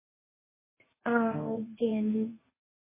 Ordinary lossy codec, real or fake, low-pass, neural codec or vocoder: MP3, 16 kbps; fake; 3.6 kHz; codec, 24 kHz, 0.9 kbps, WavTokenizer, medium speech release version 1